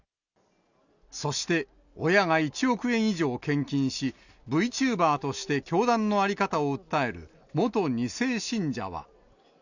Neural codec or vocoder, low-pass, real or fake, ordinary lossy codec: none; 7.2 kHz; real; none